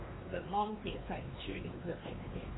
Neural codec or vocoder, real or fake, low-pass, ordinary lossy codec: codec, 16 kHz, 2 kbps, X-Codec, WavLM features, trained on Multilingual LibriSpeech; fake; 7.2 kHz; AAC, 16 kbps